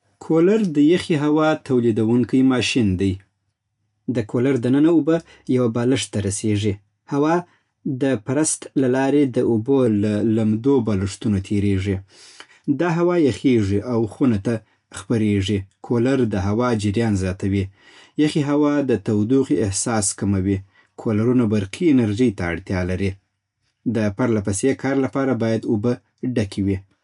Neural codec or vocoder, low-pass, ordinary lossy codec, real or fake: none; 10.8 kHz; none; real